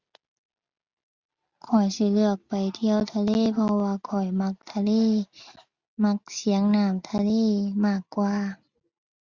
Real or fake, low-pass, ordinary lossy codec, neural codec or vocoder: real; 7.2 kHz; Opus, 64 kbps; none